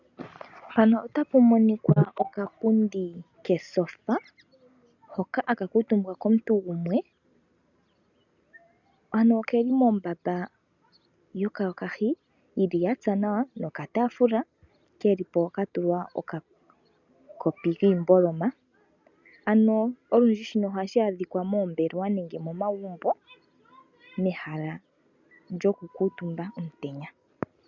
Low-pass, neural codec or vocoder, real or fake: 7.2 kHz; none; real